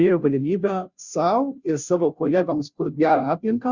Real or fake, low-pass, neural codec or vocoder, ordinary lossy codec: fake; 7.2 kHz; codec, 16 kHz, 0.5 kbps, FunCodec, trained on Chinese and English, 25 frames a second; Opus, 64 kbps